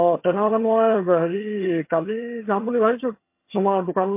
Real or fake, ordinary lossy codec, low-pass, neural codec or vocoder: fake; MP3, 24 kbps; 3.6 kHz; vocoder, 22.05 kHz, 80 mel bands, HiFi-GAN